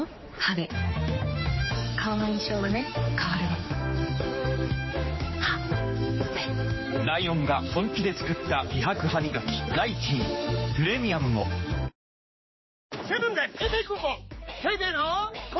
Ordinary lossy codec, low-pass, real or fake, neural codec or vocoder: MP3, 24 kbps; 7.2 kHz; fake; codec, 16 kHz, 4 kbps, X-Codec, HuBERT features, trained on general audio